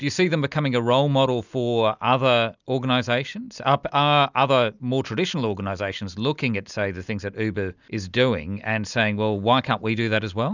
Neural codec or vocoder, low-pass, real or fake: none; 7.2 kHz; real